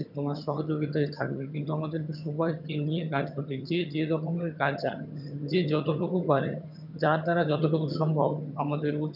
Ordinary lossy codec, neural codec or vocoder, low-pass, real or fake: none; vocoder, 22.05 kHz, 80 mel bands, HiFi-GAN; 5.4 kHz; fake